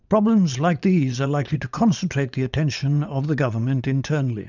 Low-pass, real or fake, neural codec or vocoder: 7.2 kHz; fake; vocoder, 44.1 kHz, 80 mel bands, Vocos